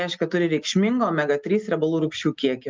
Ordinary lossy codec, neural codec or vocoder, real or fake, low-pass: Opus, 24 kbps; none; real; 7.2 kHz